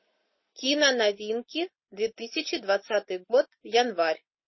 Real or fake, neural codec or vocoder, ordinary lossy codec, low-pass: real; none; MP3, 24 kbps; 7.2 kHz